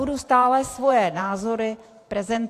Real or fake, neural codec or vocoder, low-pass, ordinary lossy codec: fake; vocoder, 44.1 kHz, 128 mel bands every 512 samples, BigVGAN v2; 14.4 kHz; AAC, 64 kbps